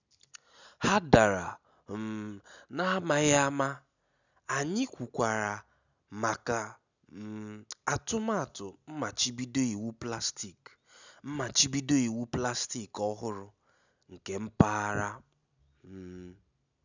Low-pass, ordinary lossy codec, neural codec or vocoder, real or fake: 7.2 kHz; none; none; real